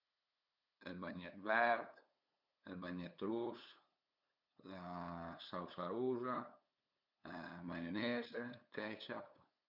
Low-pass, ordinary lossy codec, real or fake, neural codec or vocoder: 5.4 kHz; none; fake; codec, 16 kHz, 8 kbps, FunCodec, trained on LibriTTS, 25 frames a second